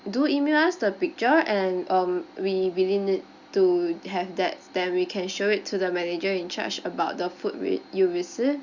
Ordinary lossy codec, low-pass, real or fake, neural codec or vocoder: Opus, 64 kbps; 7.2 kHz; real; none